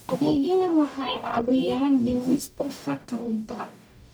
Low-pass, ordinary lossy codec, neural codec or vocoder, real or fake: none; none; codec, 44.1 kHz, 0.9 kbps, DAC; fake